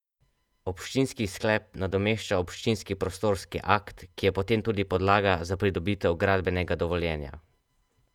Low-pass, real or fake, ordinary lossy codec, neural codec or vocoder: 19.8 kHz; fake; none; vocoder, 48 kHz, 128 mel bands, Vocos